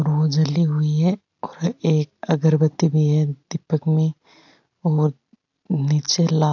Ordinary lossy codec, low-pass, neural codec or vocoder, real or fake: AAC, 48 kbps; 7.2 kHz; none; real